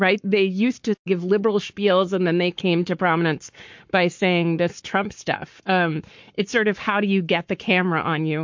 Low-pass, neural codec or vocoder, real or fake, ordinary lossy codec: 7.2 kHz; codec, 44.1 kHz, 7.8 kbps, Pupu-Codec; fake; MP3, 48 kbps